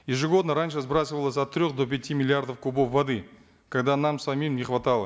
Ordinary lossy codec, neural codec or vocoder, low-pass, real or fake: none; none; none; real